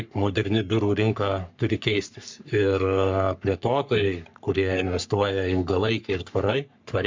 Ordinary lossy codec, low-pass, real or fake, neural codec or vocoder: MP3, 64 kbps; 7.2 kHz; fake; codec, 44.1 kHz, 3.4 kbps, Pupu-Codec